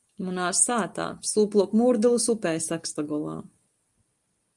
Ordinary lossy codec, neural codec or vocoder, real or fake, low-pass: Opus, 32 kbps; none; real; 10.8 kHz